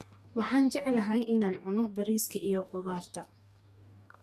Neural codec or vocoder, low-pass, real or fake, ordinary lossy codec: codec, 44.1 kHz, 2.6 kbps, DAC; 14.4 kHz; fake; none